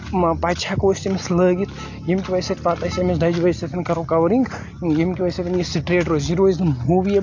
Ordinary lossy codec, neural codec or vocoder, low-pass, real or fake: AAC, 48 kbps; none; 7.2 kHz; real